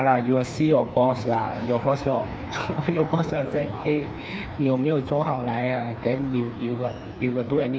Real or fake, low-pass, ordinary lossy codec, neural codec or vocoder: fake; none; none; codec, 16 kHz, 2 kbps, FreqCodec, larger model